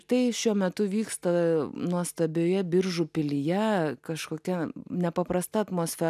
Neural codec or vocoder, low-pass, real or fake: none; 14.4 kHz; real